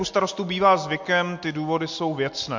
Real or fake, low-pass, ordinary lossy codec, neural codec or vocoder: real; 7.2 kHz; MP3, 48 kbps; none